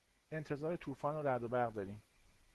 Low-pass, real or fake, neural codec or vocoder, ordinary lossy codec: 14.4 kHz; real; none; Opus, 16 kbps